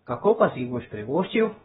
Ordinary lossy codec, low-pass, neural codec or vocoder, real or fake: AAC, 16 kbps; 7.2 kHz; codec, 16 kHz, about 1 kbps, DyCAST, with the encoder's durations; fake